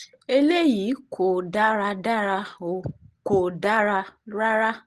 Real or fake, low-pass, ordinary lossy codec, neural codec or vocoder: real; 10.8 kHz; Opus, 16 kbps; none